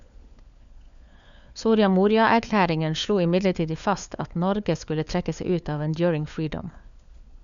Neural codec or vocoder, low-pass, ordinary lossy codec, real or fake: codec, 16 kHz, 4 kbps, FunCodec, trained on LibriTTS, 50 frames a second; 7.2 kHz; none; fake